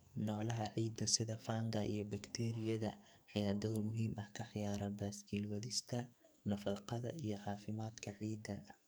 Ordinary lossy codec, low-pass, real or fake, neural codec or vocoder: none; none; fake; codec, 44.1 kHz, 2.6 kbps, SNAC